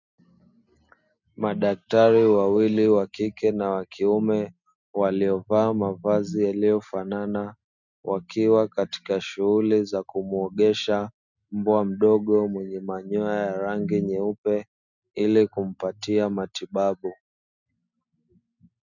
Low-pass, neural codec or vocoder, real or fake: 7.2 kHz; none; real